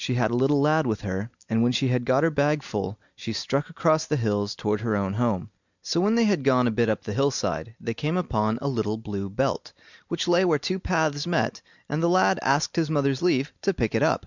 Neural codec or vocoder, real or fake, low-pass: none; real; 7.2 kHz